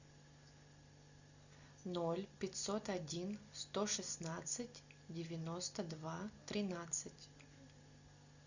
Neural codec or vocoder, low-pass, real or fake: none; 7.2 kHz; real